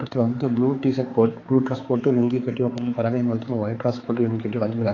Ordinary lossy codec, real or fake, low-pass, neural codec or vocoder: AAC, 32 kbps; fake; 7.2 kHz; codec, 16 kHz, 4 kbps, X-Codec, HuBERT features, trained on balanced general audio